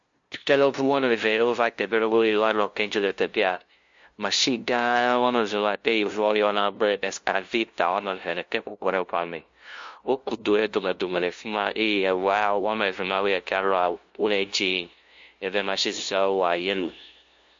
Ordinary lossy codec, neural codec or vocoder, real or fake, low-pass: MP3, 48 kbps; codec, 16 kHz, 0.5 kbps, FunCodec, trained on LibriTTS, 25 frames a second; fake; 7.2 kHz